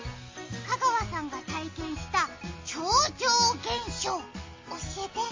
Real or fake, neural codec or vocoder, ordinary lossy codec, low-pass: real; none; MP3, 32 kbps; 7.2 kHz